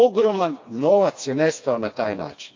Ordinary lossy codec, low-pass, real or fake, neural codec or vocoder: AAC, 48 kbps; 7.2 kHz; fake; codec, 16 kHz, 2 kbps, FreqCodec, smaller model